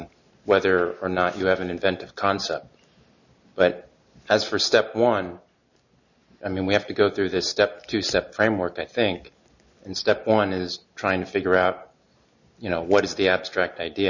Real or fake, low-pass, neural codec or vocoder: real; 7.2 kHz; none